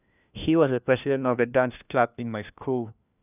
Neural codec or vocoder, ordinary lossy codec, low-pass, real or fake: codec, 16 kHz, 1 kbps, FunCodec, trained on LibriTTS, 50 frames a second; none; 3.6 kHz; fake